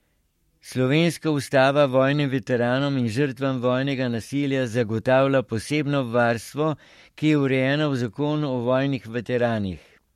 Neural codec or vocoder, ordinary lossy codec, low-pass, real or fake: codec, 44.1 kHz, 7.8 kbps, Pupu-Codec; MP3, 64 kbps; 19.8 kHz; fake